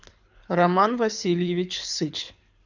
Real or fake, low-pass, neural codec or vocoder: fake; 7.2 kHz; codec, 24 kHz, 6 kbps, HILCodec